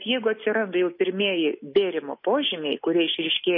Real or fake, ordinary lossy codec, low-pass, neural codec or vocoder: real; MP3, 24 kbps; 5.4 kHz; none